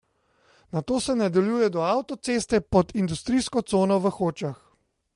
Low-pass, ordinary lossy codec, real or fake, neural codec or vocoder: 10.8 kHz; MP3, 48 kbps; real; none